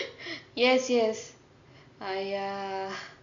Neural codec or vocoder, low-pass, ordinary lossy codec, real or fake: none; 7.2 kHz; none; real